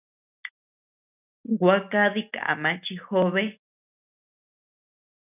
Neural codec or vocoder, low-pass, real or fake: none; 3.6 kHz; real